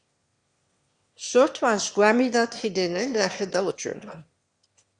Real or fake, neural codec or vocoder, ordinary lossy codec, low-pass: fake; autoencoder, 22.05 kHz, a latent of 192 numbers a frame, VITS, trained on one speaker; Opus, 64 kbps; 9.9 kHz